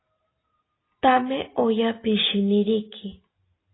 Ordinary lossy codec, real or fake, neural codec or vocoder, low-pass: AAC, 16 kbps; real; none; 7.2 kHz